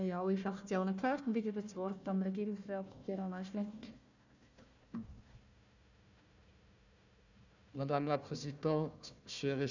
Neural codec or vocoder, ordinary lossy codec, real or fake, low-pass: codec, 16 kHz, 1 kbps, FunCodec, trained on Chinese and English, 50 frames a second; none; fake; 7.2 kHz